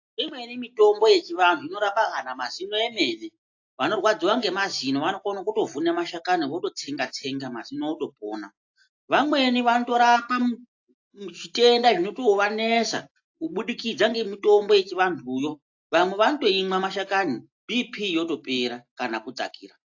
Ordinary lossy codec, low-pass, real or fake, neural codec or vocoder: AAC, 48 kbps; 7.2 kHz; real; none